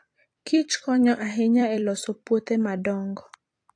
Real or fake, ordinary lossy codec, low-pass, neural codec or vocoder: fake; AAC, 48 kbps; 9.9 kHz; vocoder, 44.1 kHz, 128 mel bands every 256 samples, BigVGAN v2